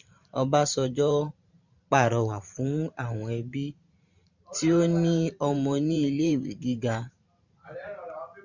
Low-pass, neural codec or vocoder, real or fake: 7.2 kHz; vocoder, 44.1 kHz, 128 mel bands every 512 samples, BigVGAN v2; fake